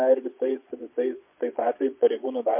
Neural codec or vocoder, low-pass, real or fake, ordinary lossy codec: vocoder, 22.05 kHz, 80 mel bands, Vocos; 3.6 kHz; fake; MP3, 32 kbps